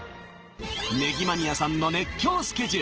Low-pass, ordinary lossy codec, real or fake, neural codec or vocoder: 7.2 kHz; Opus, 16 kbps; real; none